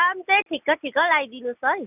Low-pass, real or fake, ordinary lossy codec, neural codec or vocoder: 3.6 kHz; real; none; none